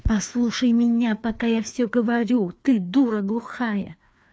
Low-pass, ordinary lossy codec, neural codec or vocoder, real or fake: none; none; codec, 16 kHz, 2 kbps, FreqCodec, larger model; fake